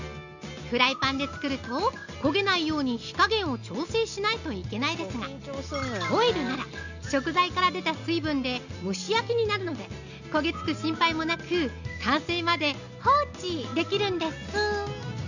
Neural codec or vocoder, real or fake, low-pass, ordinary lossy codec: none; real; 7.2 kHz; none